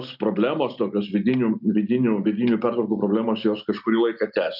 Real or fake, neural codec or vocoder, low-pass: real; none; 5.4 kHz